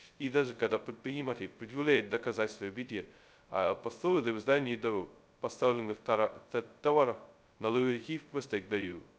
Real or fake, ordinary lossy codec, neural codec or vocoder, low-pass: fake; none; codec, 16 kHz, 0.2 kbps, FocalCodec; none